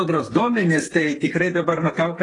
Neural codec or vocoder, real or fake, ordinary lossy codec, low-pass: codec, 32 kHz, 1.9 kbps, SNAC; fake; AAC, 32 kbps; 10.8 kHz